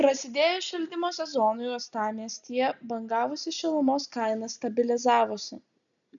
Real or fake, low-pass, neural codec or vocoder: real; 7.2 kHz; none